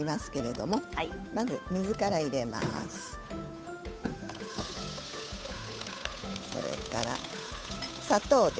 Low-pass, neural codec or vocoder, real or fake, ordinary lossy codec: none; codec, 16 kHz, 8 kbps, FunCodec, trained on Chinese and English, 25 frames a second; fake; none